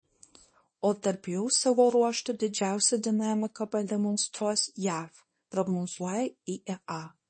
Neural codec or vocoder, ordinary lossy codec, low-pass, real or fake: codec, 24 kHz, 0.9 kbps, WavTokenizer, small release; MP3, 32 kbps; 9.9 kHz; fake